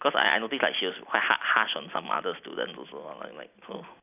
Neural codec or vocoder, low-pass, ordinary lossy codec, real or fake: none; 3.6 kHz; none; real